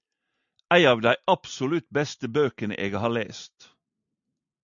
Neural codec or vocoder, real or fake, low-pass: none; real; 7.2 kHz